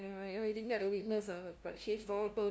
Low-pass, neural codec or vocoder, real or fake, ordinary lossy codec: none; codec, 16 kHz, 0.5 kbps, FunCodec, trained on LibriTTS, 25 frames a second; fake; none